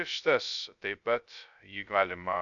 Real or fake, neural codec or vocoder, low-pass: fake; codec, 16 kHz, 0.2 kbps, FocalCodec; 7.2 kHz